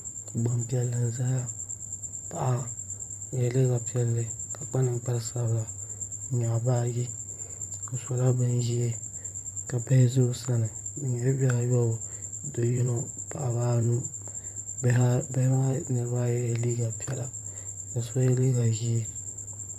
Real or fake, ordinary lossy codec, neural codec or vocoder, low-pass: fake; MP3, 96 kbps; vocoder, 44.1 kHz, 128 mel bands, Pupu-Vocoder; 14.4 kHz